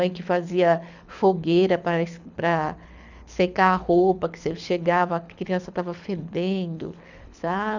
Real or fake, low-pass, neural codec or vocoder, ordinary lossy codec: fake; 7.2 kHz; codec, 24 kHz, 6 kbps, HILCodec; none